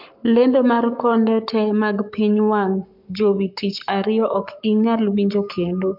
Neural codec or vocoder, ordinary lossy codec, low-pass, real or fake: codec, 44.1 kHz, 7.8 kbps, Pupu-Codec; MP3, 48 kbps; 5.4 kHz; fake